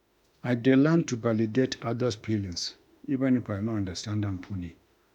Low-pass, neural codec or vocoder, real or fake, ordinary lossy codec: 19.8 kHz; autoencoder, 48 kHz, 32 numbers a frame, DAC-VAE, trained on Japanese speech; fake; none